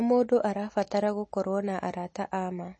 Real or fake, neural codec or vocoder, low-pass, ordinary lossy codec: real; none; 9.9 kHz; MP3, 32 kbps